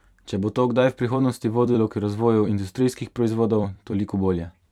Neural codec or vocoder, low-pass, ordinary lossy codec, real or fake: vocoder, 44.1 kHz, 128 mel bands every 256 samples, BigVGAN v2; 19.8 kHz; none; fake